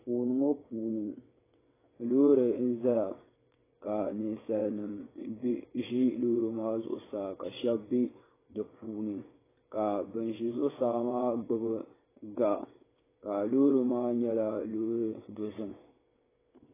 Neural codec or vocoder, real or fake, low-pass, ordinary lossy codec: vocoder, 22.05 kHz, 80 mel bands, WaveNeXt; fake; 3.6 kHz; AAC, 16 kbps